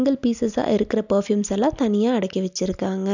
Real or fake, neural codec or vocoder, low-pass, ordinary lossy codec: real; none; 7.2 kHz; none